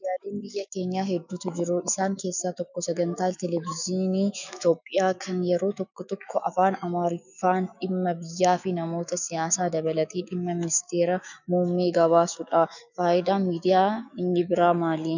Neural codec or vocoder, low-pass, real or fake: autoencoder, 48 kHz, 128 numbers a frame, DAC-VAE, trained on Japanese speech; 7.2 kHz; fake